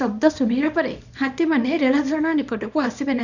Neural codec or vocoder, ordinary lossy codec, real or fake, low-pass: codec, 24 kHz, 0.9 kbps, WavTokenizer, small release; none; fake; 7.2 kHz